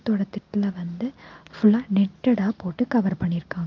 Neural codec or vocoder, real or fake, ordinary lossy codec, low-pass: none; real; Opus, 32 kbps; 7.2 kHz